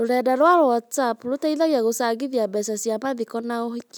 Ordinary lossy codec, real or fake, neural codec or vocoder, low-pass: none; real; none; none